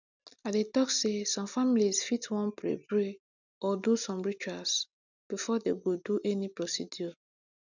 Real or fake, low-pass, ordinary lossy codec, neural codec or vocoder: real; 7.2 kHz; none; none